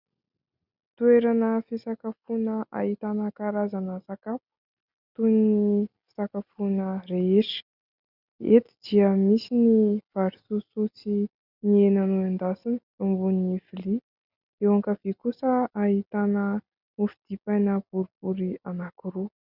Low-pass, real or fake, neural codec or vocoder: 5.4 kHz; real; none